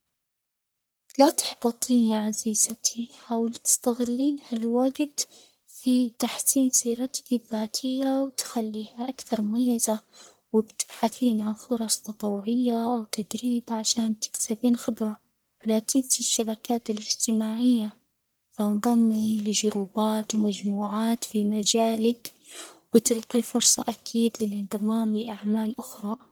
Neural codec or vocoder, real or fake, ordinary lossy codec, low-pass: codec, 44.1 kHz, 1.7 kbps, Pupu-Codec; fake; none; none